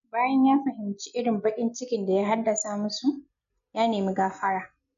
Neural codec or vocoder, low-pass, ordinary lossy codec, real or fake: none; 7.2 kHz; none; real